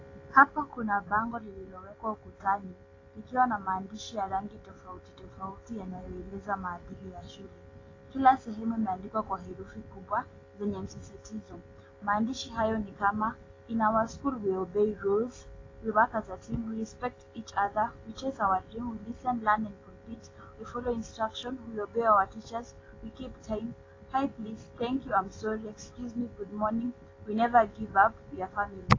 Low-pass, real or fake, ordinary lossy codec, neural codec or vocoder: 7.2 kHz; real; AAC, 32 kbps; none